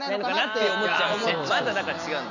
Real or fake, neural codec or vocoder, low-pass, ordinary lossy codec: real; none; 7.2 kHz; none